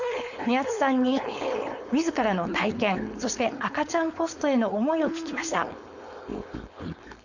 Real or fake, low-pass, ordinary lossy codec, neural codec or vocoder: fake; 7.2 kHz; none; codec, 16 kHz, 4.8 kbps, FACodec